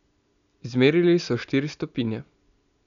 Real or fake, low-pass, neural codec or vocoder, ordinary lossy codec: real; 7.2 kHz; none; none